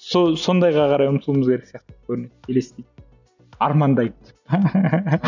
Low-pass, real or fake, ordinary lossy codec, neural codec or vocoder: 7.2 kHz; real; none; none